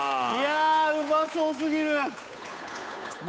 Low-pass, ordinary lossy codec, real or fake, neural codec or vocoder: none; none; fake; codec, 16 kHz, 8 kbps, FunCodec, trained on Chinese and English, 25 frames a second